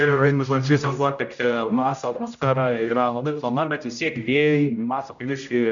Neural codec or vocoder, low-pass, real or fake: codec, 16 kHz, 0.5 kbps, X-Codec, HuBERT features, trained on general audio; 7.2 kHz; fake